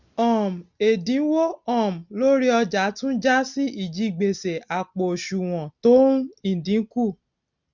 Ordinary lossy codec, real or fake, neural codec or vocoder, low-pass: none; real; none; 7.2 kHz